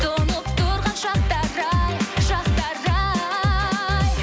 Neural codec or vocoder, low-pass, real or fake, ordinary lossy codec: none; none; real; none